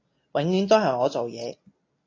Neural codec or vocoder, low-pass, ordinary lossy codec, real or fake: none; 7.2 kHz; AAC, 32 kbps; real